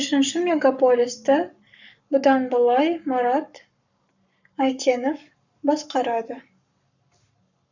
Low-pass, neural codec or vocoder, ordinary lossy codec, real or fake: 7.2 kHz; codec, 44.1 kHz, 7.8 kbps, Pupu-Codec; none; fake